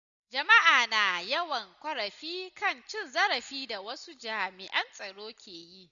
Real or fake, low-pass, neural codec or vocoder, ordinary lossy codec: real; 7.2 kHz; none; none